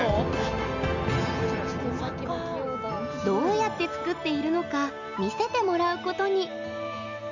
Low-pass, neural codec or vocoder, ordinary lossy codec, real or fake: 7.2 kHz; none; Opus, 64 kbps; real